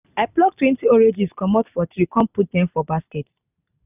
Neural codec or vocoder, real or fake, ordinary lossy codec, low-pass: none; real; none; 3.6 kHz